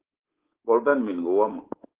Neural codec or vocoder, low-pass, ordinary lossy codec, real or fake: codec, 24 kHz, 3.1 kbps, DualCodec; 3.6 kHz; Opus, 16 kbps; fake